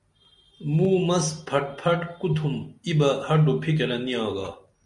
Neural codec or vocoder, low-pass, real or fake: none; 10.8 kHz; real